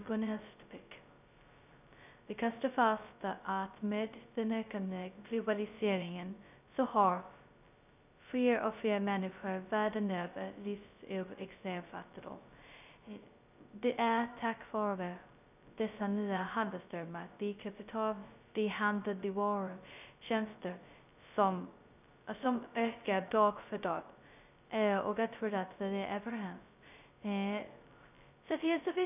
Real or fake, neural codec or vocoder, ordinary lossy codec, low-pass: fake; codec, 16 kHz, 0.2 kbps, FocalCodec; none; 3.6 kHz